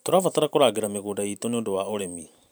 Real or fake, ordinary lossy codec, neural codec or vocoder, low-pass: fake; none; vocoder, 44.1 kHz, 128 mel bands every 512 samples, BigVGAN v2; none